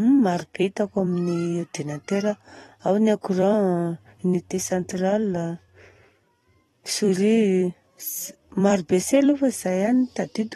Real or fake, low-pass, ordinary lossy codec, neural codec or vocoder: fake; 19.8 kHz; AAC, 32 kbps; vocoder, 44.1 kHz, 128 mel bands every 256 samples, BigVGAN v2